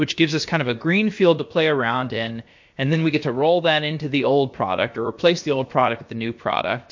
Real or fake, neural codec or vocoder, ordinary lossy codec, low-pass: fake; codec, 16 kHz, about 1 kbps, DyCAST, with the encoder's durations; MP3, 48 kbps; 7.2 kHz